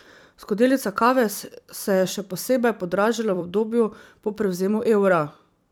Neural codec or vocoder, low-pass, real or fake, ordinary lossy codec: vocoder, 44.1 kHz, 128 mel bands every 256 samples, BigVGAN v2; none; fake; none